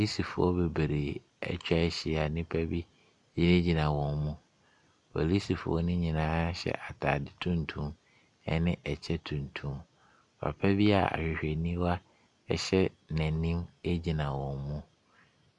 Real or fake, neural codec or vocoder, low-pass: real; none; 10.8 kHz